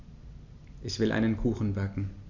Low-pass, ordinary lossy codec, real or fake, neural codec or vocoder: 7.2 kHz; none; real; none